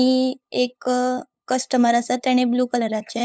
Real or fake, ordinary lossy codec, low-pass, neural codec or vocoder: fake; none; none; codec, 16 kHz, 8 kbps, FunCodec, trained on LibriTTS, 25 frames a second